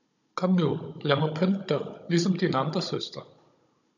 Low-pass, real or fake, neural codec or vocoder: 7.2 kHz; fake; codec, 16 kHz, 16 kbps, FunCodec, trained on Chinese and English, 50 frames a second